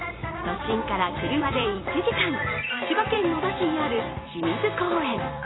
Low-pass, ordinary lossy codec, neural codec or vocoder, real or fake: 7.2 kHz; AAC, 16 kbps; vocoder, 44.1 kHz, 128 mel bands every 256 samples, BigVGAN v2; fake